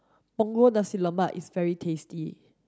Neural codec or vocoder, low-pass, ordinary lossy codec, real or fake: none; none; none; real